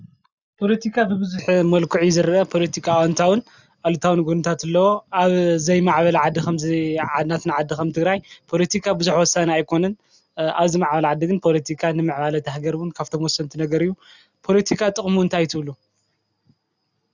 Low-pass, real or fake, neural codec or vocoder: 7.2 kHz; real; none